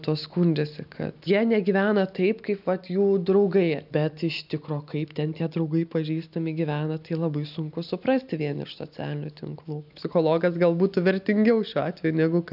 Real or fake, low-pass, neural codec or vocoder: real; 5.4 kHz; none